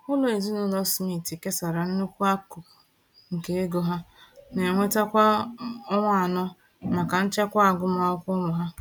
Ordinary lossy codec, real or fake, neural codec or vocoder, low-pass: none; real; none; 19.8 kHz